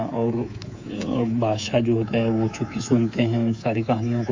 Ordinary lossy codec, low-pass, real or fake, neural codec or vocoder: AAC, 48 kbps; 7.2 kHz; fake; codec, 24 kHz, 3.1 kbps, DualCodec